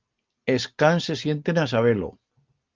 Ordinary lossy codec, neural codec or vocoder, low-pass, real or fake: Opus, 24 kbps; none; 7.2 kHz; real